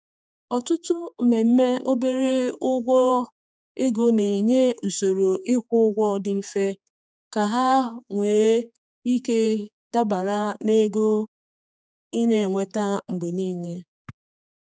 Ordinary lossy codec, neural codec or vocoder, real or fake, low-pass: none; codec, 16 kHz, 4 kbps, X-Codec, HuBERT features, trained on general audio; fake; none